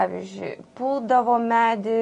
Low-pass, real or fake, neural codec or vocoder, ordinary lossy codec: 14.4 kHz; real; none; MP3, 48 kbps